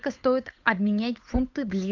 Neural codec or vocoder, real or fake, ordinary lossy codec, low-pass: codec, 16 kHz, 8 kbps, FunCodec, trained on Chinese and English, 25 frames a second; fake; AAC, 48 kbps; 7.2 kHz